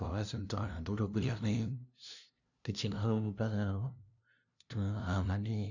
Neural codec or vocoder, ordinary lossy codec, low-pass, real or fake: codec, 16 kHz, 0.5 kbps, FunCodec, trained on LibriTTS, 25 frames a second; none; 7.2 kHz; fake